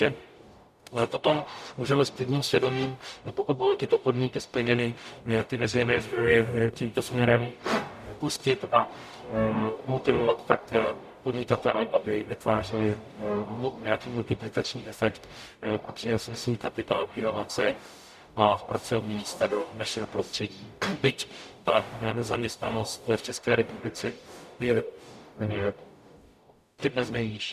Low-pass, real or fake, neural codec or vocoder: 14.4 kHz; fake; codec, 44.1 kHz, 0.9 kbps, DAC